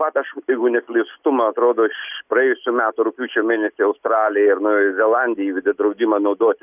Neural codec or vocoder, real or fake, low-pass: none; real; 3.6 kHz